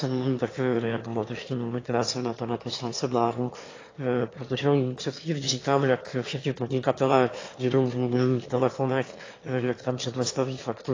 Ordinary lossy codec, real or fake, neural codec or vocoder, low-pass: AAC, 32 kbps; fake; autoencoder, 22.05 kHz, a latent of 192 numbers a frame, VITS, trained on one speaker; 7.2 kHz